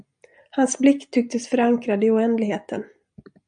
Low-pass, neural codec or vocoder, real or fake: 9.9 kHz; none; real